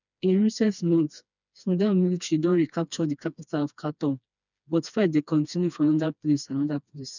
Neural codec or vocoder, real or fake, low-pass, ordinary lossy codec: codec, 16 kHz, 2 kbps, FreqCodec, smaller model; fake; 7.2 kHz; none